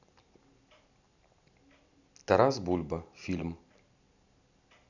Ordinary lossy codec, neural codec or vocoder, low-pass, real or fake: none; none; 7.2 kHz; real